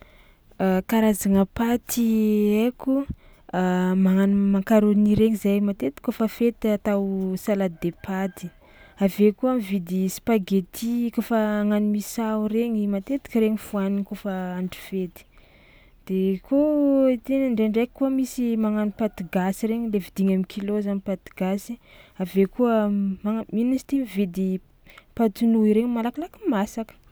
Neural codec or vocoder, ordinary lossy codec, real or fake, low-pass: none; none; real; none